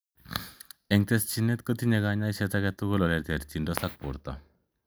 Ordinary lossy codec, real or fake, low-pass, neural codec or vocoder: none; real; none; none